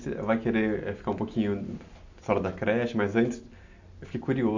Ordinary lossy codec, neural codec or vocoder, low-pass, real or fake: AAC, 48 kbps; none; 7.2 kHz; real